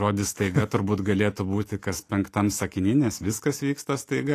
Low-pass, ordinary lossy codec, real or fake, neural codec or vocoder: 14.4 kHz; AAC, 48 kbps; fake; autoencoder, 48 kHz, 128 numbers a frame, DAC-VAE, trained on Japanese speech